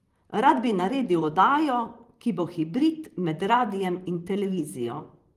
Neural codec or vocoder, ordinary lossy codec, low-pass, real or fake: vocoder, 44.1 kHz, 128 mel bands, Pupu-Vocoder; Opus, 24 kbps; 14.4 kHz; fake